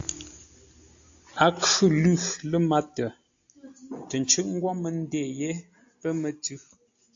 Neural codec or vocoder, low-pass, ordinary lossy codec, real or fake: none; 7.2 kHz; AAC, 64 kbps; real